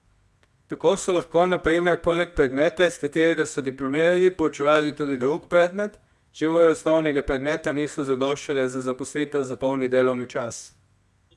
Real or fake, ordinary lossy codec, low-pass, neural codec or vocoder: fake; none; none; codec, 24 kHz, 0.9 kbps, WavTokenizer, medium music audio release